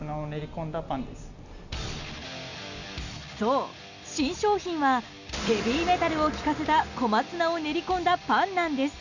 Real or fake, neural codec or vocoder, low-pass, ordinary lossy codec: real; none; 7.2 kHz; none